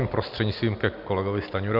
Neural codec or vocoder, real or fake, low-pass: none; real; 5.4 kHz